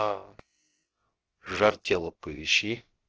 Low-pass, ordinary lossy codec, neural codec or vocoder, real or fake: 7.2 kHz; Opus, 16 kbps; codec, 16 kHz, about 1 kbps, DyCAST, with the encoder's durations; fake